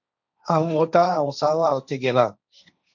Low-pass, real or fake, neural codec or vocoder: 7.2 kHz; fake; codec, 16 kHz, 1.1 kbps, Voila-Tokenizer